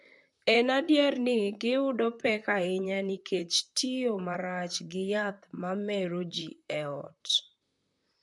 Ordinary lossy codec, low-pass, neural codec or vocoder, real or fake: MP3, 64 kbps; 10.8 kHz; vocoder, 44.1 kHz, 128 mel bands, Pupu-Vocoder; fake